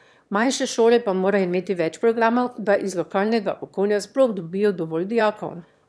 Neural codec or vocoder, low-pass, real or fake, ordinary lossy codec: autoencoder, 22.05 kHz, a latent of 192 numbers a frame, VITS, trained on one speaker; none; fake; none